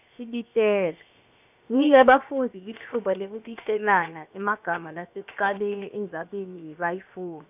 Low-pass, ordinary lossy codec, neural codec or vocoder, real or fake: 3.6 kHz; none; codec, 16 kHz, 0.7 kbps, FocalCodec; fake